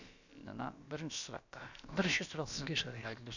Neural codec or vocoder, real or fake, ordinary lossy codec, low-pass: codec, 16 kHz, about 1 kbps, DyCAST, with the encoder's durations; fake; none; 7.2 kHz